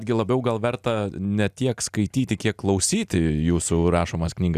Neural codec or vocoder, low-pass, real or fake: none; 14.4 kHz; real